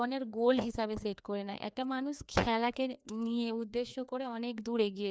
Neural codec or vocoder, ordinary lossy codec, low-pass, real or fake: codec, 16 kHz, 2 kbps, FreqCodec, larger model; none; none; fake